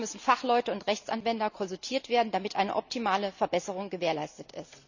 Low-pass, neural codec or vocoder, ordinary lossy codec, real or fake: 7.2 kHz; none; none; real